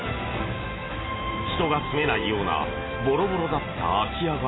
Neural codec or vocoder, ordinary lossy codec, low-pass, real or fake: none; AAC, 16 kbps; 7.2 kHz; real